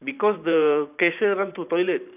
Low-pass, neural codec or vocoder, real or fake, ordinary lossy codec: 3.6 kHz; vocoder, 44.1 kHz, 128 mel bands every 512 samples, BigVGAN v2; fake; none